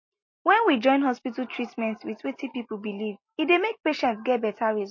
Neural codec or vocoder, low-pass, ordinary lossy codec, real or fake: none; 7.2 kHz; MP3, 32 kbps; real